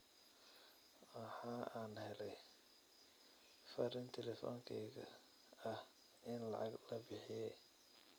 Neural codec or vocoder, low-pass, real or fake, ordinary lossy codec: none; none; real; none